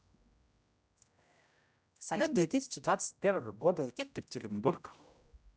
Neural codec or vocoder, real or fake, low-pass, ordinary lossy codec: codec, 16 kHz, 0.5 kbps, X-Codec, HuBERT features, trained on general audio; fake; none; none